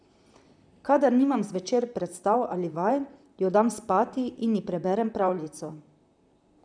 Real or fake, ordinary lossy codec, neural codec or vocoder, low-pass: fake; none; vocoder, 44.1 kHz, 128 mel bands, Pupu-Vocoder; 9.9 kHz